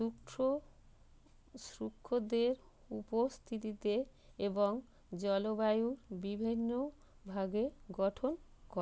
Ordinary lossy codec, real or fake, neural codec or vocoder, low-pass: none; real; none; none